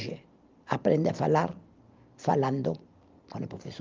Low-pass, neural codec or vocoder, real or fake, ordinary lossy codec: 7.2 kHz; none; real; Opus, 32 kbps